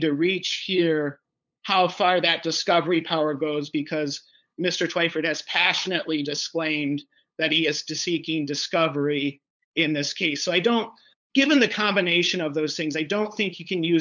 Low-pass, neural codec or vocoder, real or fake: 7.2 kHz; codec, 16 kHz, 8 kbps, FunCodec, trained on LibriTTS, 25 frames a second; fake